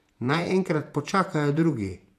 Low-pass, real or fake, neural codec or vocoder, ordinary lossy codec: 14.4 kHz; fake; vocoder, 48 kHz, 128 mel bands, Vocos; none